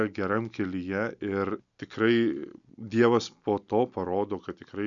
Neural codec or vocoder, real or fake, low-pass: none; real; 7.2 kHz